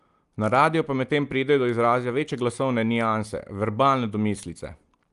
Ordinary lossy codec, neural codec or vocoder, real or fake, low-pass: Opus, 32 kbps; none; real; 10.8 kHz